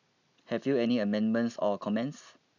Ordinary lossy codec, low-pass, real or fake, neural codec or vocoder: none; 7.2 kHz; real; none